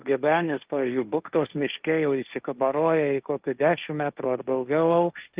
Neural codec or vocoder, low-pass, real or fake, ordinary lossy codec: codec, 16 kHz, 1.1 kbps, Voila-Tokenizer; 3.6 kHz; fake; Opus, 24 kbps